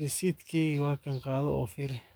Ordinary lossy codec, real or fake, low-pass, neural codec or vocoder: none; fake; none; codec, 44.1 kHz, 7.8 kbps, DAC